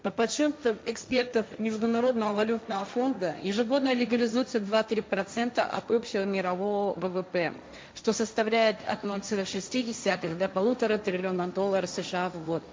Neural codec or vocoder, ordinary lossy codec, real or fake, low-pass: codec, 16 kHz, 1.1 kbps, Voila-Tokenizer; none; fake; none